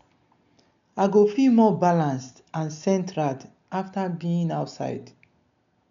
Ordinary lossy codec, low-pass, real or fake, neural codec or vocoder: none; 7.2 kHz; real; none